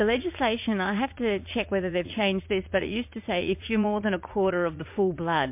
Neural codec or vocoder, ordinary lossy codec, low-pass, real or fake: vocoder, 44.1 kHz, 80 mel bands, Vocos; MP3, 32 kbps; 3.6 kHz; fake